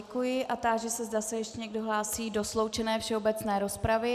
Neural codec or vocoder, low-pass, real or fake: none; 14.4 kHz; real